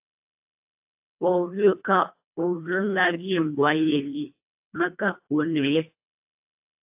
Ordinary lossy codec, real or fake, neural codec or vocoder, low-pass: AAC, 32 kbps; fake; codec, 24 kHz, 1.5 kbps, HILCodec; 3.6 kHz